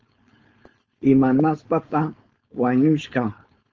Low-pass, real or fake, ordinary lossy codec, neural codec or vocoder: 7.2 kHz; fake; Opus, 24 kbps; codec, 16 kHz, 4.8 kbps, FACodec